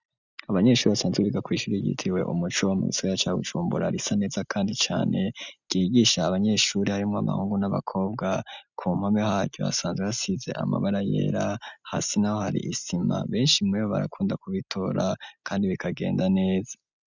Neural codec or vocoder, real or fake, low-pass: none; real; 7.2 kHz